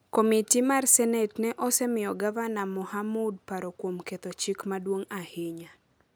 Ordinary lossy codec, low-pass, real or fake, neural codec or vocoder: none; none; real; none